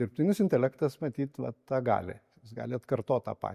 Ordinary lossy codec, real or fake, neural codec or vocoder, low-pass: MP3, 64 kbps; real; none; 14.4 kHz